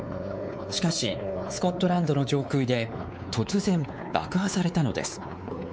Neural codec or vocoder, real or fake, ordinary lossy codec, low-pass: codec, 16 kHz, 4 kbps, X-Codec, WavLM features, trained on Multilingual LibriSpeech; fake; none; none